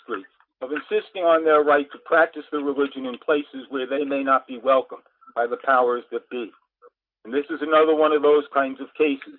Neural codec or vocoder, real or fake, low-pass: none; real; 5.4 kHz